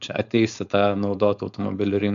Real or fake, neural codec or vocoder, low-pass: fake; codec, 16 kHz, 4.8 kbps, FACodec; 7.2 kHz